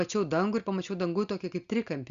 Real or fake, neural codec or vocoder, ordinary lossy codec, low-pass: real; none; Opus, 64 kbps; 7.2 kHz